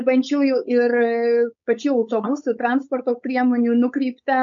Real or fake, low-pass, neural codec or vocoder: fake; 7.2 kHz; codec, 16 kHz, 4.8 kbps, FACodec